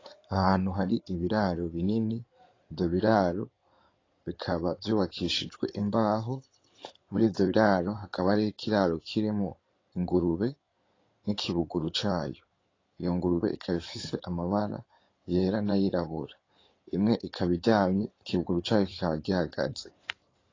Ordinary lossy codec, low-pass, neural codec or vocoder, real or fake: AAC, 32 kbps; 7.2 kHz; codec, 16 kHz in and 24 kHz out, 2.2 kbps, FireRedTTS-2 codec; fake